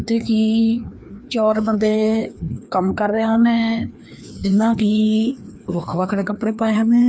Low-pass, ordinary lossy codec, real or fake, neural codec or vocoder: none; none; fake; codec, 16 kHz, 2 kbps, FreqCodec, larger model